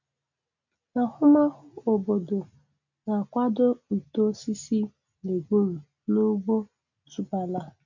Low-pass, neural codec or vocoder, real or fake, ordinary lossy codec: 7.2 kHz; none; real; none